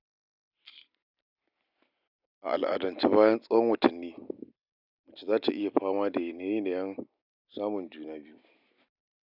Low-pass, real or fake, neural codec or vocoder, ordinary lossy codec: 5.4 kHz; real; none; none